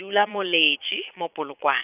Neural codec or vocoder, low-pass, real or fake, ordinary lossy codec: vocoder, 22.05 kHz, 80 mel bands, Vocos; 3.6 kHz; fake; none